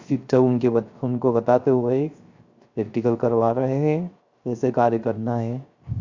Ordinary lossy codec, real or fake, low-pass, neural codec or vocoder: Opus, 64 kbps; fake; 7.2 kHz; codec, 16 kHz, 0.3 kbps, FocalCodec